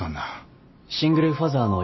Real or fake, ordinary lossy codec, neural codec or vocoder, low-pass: real; MP3, 24 kbps; none; 7.2 kHz